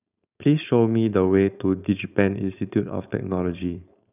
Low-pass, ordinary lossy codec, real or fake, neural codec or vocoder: 3.6 kHz; none; fake; codec, 16 kHz, 4.8 kbps, FACodec